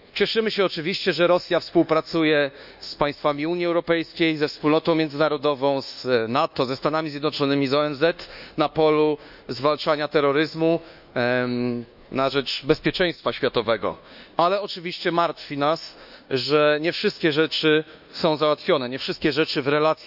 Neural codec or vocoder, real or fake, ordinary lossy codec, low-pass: codec, 24 kHz, 1.2 kbps, DualCodec; fake; none; 5.4 kHz